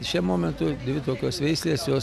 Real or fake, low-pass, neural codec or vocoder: real; 14.4 kHz; none